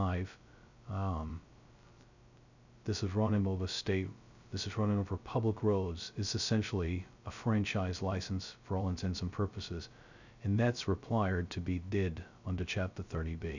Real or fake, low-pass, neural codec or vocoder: fake; 7.2 kHz; codec, 16 kHz, 0.2 kbps, FocalCodec